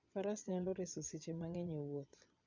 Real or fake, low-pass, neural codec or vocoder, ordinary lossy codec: fake; 7.2 kHz; vocoder, 22.05 kHz, 80 mel bands, Vocos; none